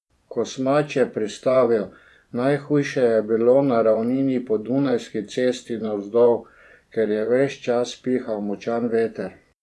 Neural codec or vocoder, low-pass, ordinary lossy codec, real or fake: vocoder, 24 kHz, 100 mel bands, Vocos; none; none; fake